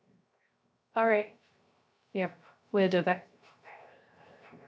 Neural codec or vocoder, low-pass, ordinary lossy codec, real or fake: codec, 16 kHz, 0.3 kbps, FocalCodec; none; none; fake